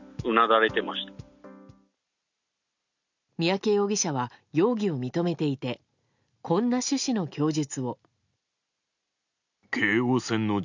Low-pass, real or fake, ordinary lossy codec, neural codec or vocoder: 7.2 kHz; real; none; none